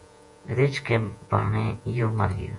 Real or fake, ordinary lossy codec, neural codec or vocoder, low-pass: fake; AAC, 64 kbps; vocoder, 48 kHz, 128 mel bands, Vocos; 10.8 kHz